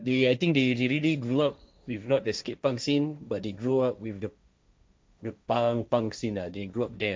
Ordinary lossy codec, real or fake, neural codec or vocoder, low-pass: none; fake; codec, 16 kHz, 1.1 kbps, Voila-Tokenizer; none